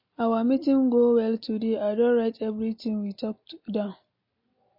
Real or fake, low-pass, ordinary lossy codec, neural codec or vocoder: real; 5.4 kHz; MP3, 32 kbps; none